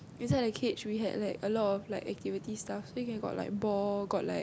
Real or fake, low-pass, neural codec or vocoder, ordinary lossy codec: real; none; none; none